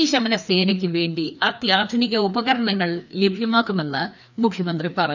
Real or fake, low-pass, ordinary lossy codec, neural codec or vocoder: fake; 7.2 kHz; none; codec, 16 kHz, 2 kbps, FreqCodec, larger model